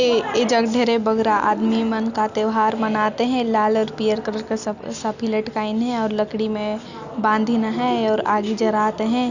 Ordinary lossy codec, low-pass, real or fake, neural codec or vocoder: Opus, 64 kbps; 7.2 kHz; real; none